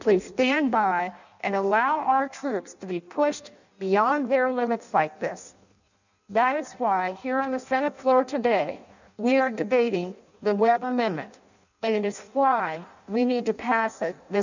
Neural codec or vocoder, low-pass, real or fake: codec, 16 kHz in and 24 kHz out, 0.6 kbps, FireRedTTS-2 codec; 7.2 kHz; fake